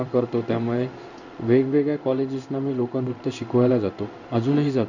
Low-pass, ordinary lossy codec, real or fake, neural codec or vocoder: 7.2 kHz; none; fake; codec, 16 kHz in and 24 kHz out, 1 kbps, XY-Tokenizer